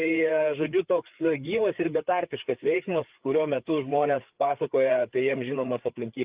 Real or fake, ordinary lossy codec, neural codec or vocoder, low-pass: fake; Opus, 24 kbps; codec, 16 kHz, 4 kbps, FreqCodec, larger model; 3.6 kHz